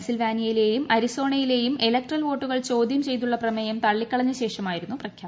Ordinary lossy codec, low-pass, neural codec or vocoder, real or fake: none; none; none; real